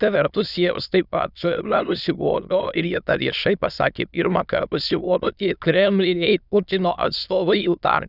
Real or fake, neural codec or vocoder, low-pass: fake; autoencoder, 22.05 kHz, a latent of 192 numbers a frame, VITS, trained on many speakers; 5.4 kHz